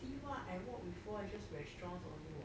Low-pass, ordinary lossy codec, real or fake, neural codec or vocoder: none; none; real; none